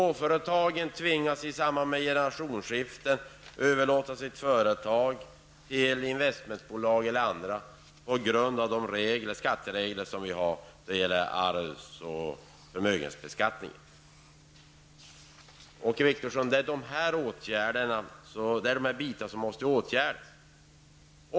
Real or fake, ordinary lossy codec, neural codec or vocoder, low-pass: real; none; none; none